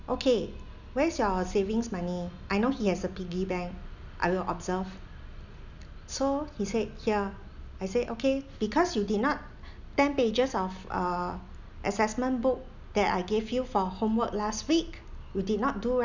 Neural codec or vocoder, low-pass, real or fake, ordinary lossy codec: none; 7.2 kHz; real; none